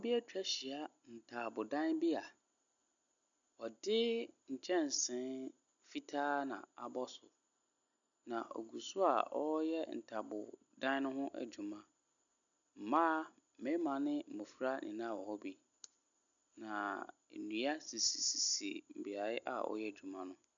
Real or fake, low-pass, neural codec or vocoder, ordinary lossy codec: real; 7.2 kHz; none; MP3, 96 kbps